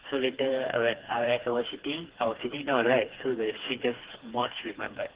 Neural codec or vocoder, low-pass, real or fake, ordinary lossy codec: codec, 16 kHz, 2 kbps, FreqCodec, smaller model; 3.6 kHz; fake; Opus, 16 kbps